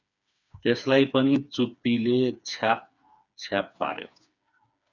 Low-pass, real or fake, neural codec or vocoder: 7.2 kHz; fake; codec, 16 kHz, 4 kbps, FreqCodec, smaller model